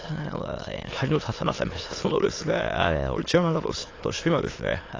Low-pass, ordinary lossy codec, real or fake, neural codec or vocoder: 7.2 kHz; AAC, 32 kbps; fake; autoencoder, 22.05 kHz, a latent of 192 numbers a frame, VITS, trained on many speakers